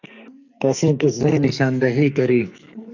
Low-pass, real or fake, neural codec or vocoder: 7.2 kHz; fake; codec, 44.1 kHz, 2.6 kbps, SNAC